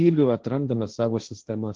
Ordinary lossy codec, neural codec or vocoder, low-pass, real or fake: Opus, 16 kbps; codec, 16 kHz, 1.1 kbps, Voila-Tokenizer; 7.2 kHz; fake